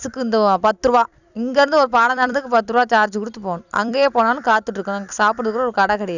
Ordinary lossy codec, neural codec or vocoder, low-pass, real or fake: none; vocoder, 44.1 kHz, 128 mel bands every 256 samples, BigVGAN v2; 7.2 kHz; fake